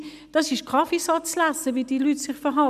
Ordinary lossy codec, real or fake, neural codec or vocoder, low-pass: none; real; none; 14.4 kHz